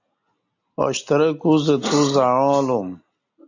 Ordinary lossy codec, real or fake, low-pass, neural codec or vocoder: AAC, 48 kbps; real; 7.2 kHz; none